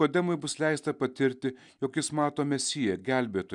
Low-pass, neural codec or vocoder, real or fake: 10.8 kHz; none; real